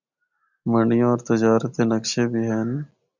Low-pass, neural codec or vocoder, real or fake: 7.2 kHz; none; real